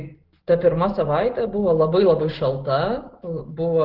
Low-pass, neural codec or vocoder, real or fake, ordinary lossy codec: 5.4 kHz; none; real; Opus, 16 kbps